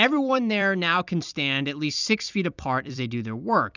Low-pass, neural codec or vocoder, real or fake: 7.2 kHz; vocoder, 44.1 kHz, 128 mel bands every 256 samples, BigVGAN v2; fake